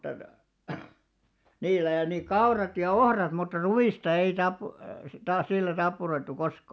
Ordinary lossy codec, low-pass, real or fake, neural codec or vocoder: none; none; real; none